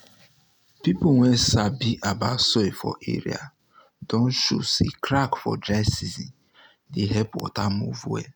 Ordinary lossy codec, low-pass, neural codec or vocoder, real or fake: none; none; none; real